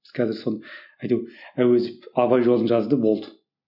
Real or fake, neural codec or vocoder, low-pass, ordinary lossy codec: real; none; 5.4 kHz; none